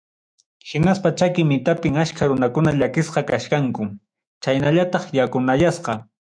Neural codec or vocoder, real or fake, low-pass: autoencoder, 48 kHz, 128 numbers a frame, DAC-VAE, trained on Japanese speech; fake; 9.9 kHz